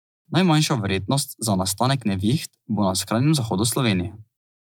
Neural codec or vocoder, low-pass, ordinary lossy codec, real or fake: none; none; none; real